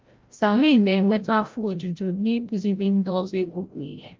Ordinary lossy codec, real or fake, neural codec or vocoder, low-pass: Opus, 32 kbps; fake; codec, 16 kHz, 0.5 kbps, FreqCodec, larger model; 7.2 kHz